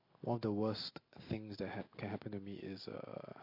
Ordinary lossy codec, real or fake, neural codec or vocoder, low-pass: MP3, 32 kbps; real; none; 5.4 kHz